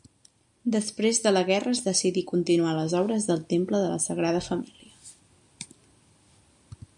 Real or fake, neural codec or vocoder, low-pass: real; none; 10.8 kHz